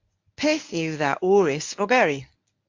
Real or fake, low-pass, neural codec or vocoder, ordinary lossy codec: fake; 7.2 kHz; codec, 24 kHz, 0.9 kbps, WavTokenizer, medium speech release version 1; AAC, 48 kbps